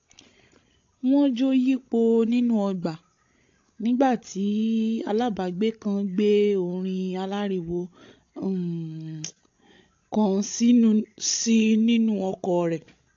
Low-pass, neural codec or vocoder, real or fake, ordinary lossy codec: 7.2 kHz; codec, 16 kHz, 16 kbps, FreqCodec, larger model; fake; AAC, 48 kbps